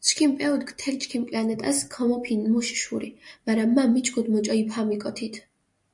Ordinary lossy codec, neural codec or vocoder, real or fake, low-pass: MP3, 96 kbps; none; real; 10.8 kHz